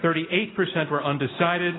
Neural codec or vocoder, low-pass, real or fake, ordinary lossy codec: none; 7.2 kHz; real; AAC, 16 kbps